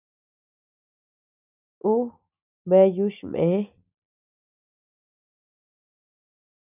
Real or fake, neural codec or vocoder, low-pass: real; none; 3.6 kHz